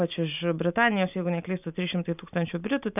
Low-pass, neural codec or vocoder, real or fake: 3.6 kHz; none; real